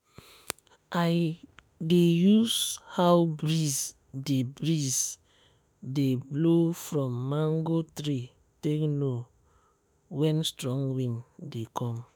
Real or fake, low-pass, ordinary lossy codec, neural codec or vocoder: fake; none; none; autoencoder, 48 kHz, 32 numbers a frame, DAC-VAE, trained on Japanese speech